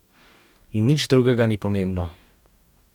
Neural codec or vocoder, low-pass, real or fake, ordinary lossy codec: codec, 44.1 kHz, 2.6 kbps, DAC; 19.8 kHz; fake; none